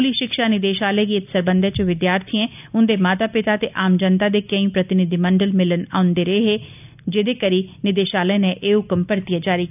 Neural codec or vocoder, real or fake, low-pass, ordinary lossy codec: none; real; 3.6 kHz; none